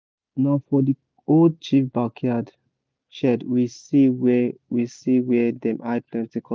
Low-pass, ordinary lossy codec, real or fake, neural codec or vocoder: none; none; real; none